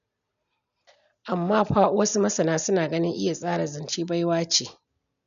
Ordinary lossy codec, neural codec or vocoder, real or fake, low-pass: none; none; real; 7.2 kHz